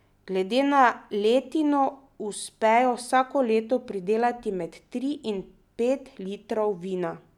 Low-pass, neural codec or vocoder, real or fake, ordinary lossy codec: 19.8 kHz; none; real; none